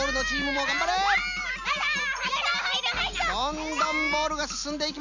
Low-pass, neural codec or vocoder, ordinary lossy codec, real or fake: 7.2 kHz; none; none; real